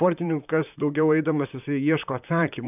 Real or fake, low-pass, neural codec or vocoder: fake; 3.6 kHz; vocoder, 44.1 kHz, 128 mel bands, Pupu-Vocoder